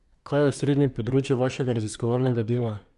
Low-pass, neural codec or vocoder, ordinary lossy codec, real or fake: 10.8 kHz; codec, 24 kHz, 1 kbps, SNAC; none; fake